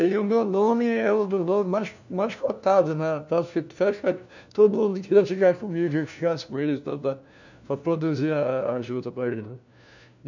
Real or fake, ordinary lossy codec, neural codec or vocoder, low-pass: fake; none; codec, 16 kHz, 1 kbps, FunCodec, trained on LibriTTS, 50 frames a second; 7.2 kHz